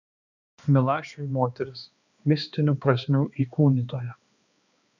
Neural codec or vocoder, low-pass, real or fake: codec, 16 kHz, 2 kbps, X-Codec, HuBERT features, trained on balanced general audio; 7.2 kHz; fake